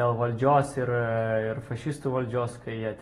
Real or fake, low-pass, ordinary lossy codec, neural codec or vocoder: real; 19.8 kHz; AAC, 32 kbps; none